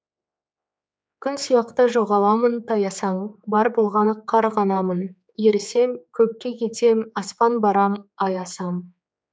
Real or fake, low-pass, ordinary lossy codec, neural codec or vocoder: fake; none; none; codec, 16 kHz, 4 kbps, X-Codec, HuBERT features, trained on general audio